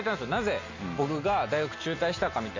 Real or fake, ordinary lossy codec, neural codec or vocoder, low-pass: real; MP3, 48 kbps; none; 7.2 kHz